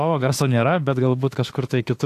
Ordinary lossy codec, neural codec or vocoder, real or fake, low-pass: AAC, 64 kbps; autoencoder, 48 kHz, 32 numbers a frame, DAC-VAE, trained on Japanese speech; fake; 14.4 kHz